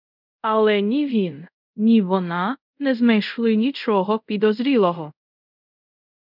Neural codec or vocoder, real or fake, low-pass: codec, 24 kHz, 0.5 kbps, DualCodec; fake; 5.4 kHz